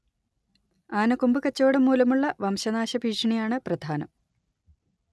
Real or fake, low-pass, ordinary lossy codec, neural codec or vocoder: real; none; none; none